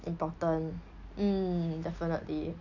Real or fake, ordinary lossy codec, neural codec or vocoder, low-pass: real; none; none; 7.2 kHz